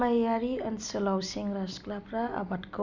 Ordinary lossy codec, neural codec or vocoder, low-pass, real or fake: none; none; 7.2 kHz; real